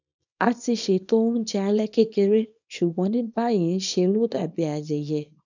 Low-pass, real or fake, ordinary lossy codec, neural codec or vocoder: 7.2 kHz; fake; none; codec, 24 kHz, 0.9 kbps, WavTokenizer, small release